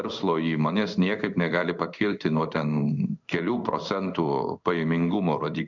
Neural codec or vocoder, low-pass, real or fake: codec, 16 kHz in and 24 kHz out, 1 kbps, XY-Tokenizer; 7.2 kHz; fake